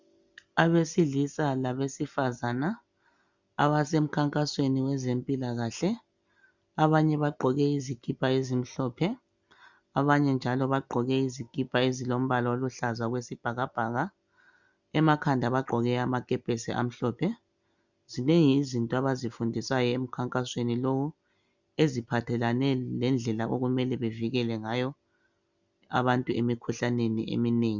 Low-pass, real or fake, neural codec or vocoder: 7.2 kHz; real; none